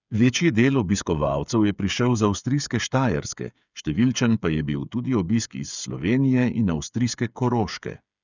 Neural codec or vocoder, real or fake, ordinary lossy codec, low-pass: codec, 16 kHz, 8 kbps, FreqCodec, smaller model; fake; none; 7.2 kHz